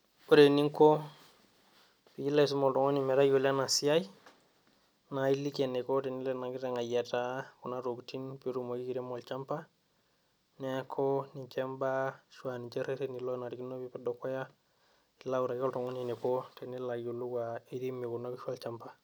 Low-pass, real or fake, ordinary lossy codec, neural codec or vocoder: none; real; none; none